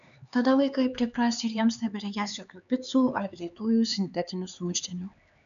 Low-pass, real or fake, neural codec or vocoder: 7.2 kHz; fake; codec, 16 kHz, 4 kbps, X-Codec, HuBERT features, trained on LibriSpeech